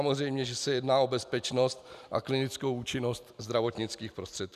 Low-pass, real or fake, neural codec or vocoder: 14.4 kHz; fake; vocoder, 44.1 kHz, 128 mel bands every 256 samples, BigVGAN v2